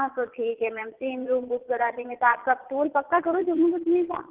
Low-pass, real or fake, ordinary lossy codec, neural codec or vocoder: 3.6 kHz; fake; Opus, 16 kbps; vocoder, 22.05 kHz, 80 mel bands, Vocos